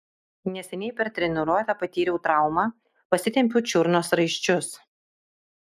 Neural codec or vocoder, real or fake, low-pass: none; real; 14.4 kHz